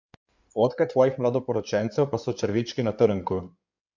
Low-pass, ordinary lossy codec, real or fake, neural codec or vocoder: 7.2 kHz; MP3, 64 kbps; fake; codec, 16 kHz in and 24 kHz out, 2.2 kbps, FireRedTTS-2 codec